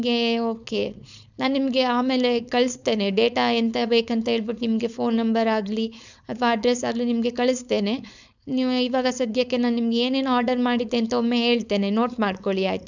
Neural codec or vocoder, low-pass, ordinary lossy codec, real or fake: codec, 16 kHz, 4.8 kbps, FACodec; 7.2 kHz; none; fake